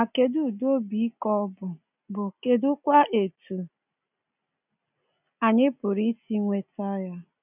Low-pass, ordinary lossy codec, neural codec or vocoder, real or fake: 3.6 kHz; none; none; real